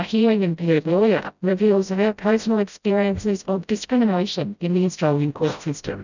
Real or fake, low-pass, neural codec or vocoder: fake; 7.2 kHz; codec, 16 kHz, 0.5 kbps, FreqCodec, smaller model